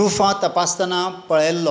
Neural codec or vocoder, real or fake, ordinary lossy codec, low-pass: none; real; none; none